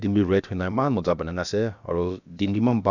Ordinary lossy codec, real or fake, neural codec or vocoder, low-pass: none; fake; codec, 16 kHz, about 1 kbps, DyCAST, with the encoder's durations; 7.2 kHz